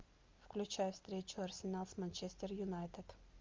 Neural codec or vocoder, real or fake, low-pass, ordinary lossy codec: none; real; 7.2 kHz; Opus, 24 kbps